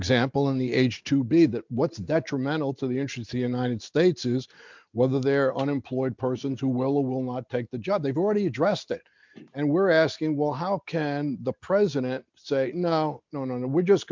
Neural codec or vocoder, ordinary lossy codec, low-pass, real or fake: none; MP3, 64 kbps; 7.2 kHz; real